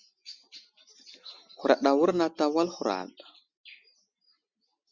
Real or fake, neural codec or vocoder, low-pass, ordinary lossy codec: real; none; 7.2 kHz; Opus, 64 kbps